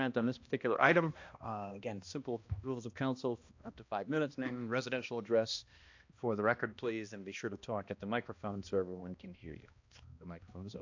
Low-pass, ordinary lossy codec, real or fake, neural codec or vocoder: 7.2 kHz; AAC, 48 kbps; fake; codec, 16 kHz, 1 kbps, X-Codec, HuBERT features, trained on balanced general audio